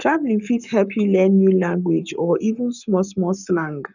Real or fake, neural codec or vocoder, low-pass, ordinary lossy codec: fake; codec, 44.1 kHz, 7.8 kbps, Pupu-Codec; 7.2 kHz; none